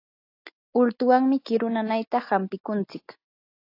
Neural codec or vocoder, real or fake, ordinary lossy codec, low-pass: none; real; AAC, 32 kbps; 5.4 kHz